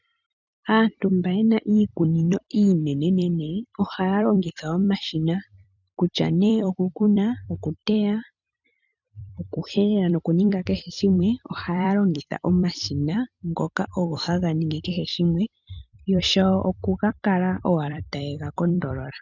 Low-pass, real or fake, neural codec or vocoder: 7.2 kHz; fake; vocoder, 44.1 kHz, 128 mel bands every 256 samples, BigVGAN v2